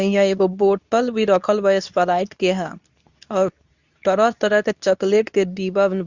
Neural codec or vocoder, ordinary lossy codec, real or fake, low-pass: codec, 24 kHz, 0.9 kbps, WavTokenizer, medium speech release version 2; Opus, 64 kbps; fake; 7.2 kHz